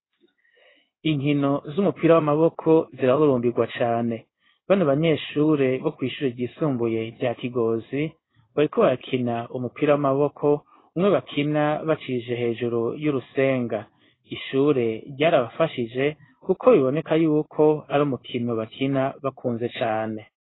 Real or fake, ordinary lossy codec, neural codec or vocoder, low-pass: fake; AAC, 16 kbps; codec, 16 kHz in and 24 kHz out, 1 kbps, XY-Tokenizer; 7.2 kHz